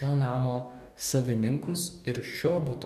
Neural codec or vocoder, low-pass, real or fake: codec, 44.1 kHz, 2.6 kbps, DAC; 14.4 kHz; fake